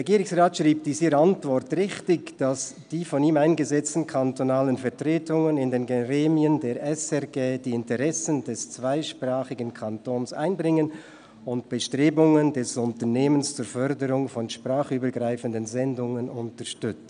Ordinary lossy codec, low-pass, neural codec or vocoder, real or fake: none; 9.9 kHz; none; real